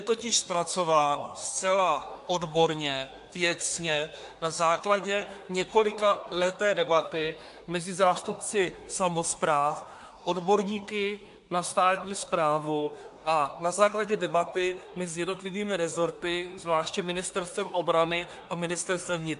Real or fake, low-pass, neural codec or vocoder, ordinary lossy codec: fake; 10.8 kHz; codec, 24 kHz, 1 kbps, SNAC; AAC, 64 kbps